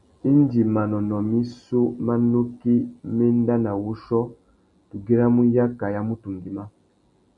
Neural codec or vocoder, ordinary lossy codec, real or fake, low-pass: none; MP3, 96 kbps; real; 10.8 kHz